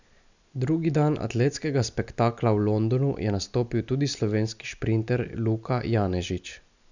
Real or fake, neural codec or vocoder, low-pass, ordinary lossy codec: real; none; 7.2 kHz; none